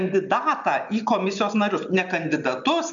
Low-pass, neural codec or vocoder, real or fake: 7.2 kHz; none; real